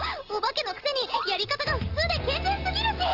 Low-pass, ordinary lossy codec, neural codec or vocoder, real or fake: 5.4 kHz; Opus, 16 kbps; none; real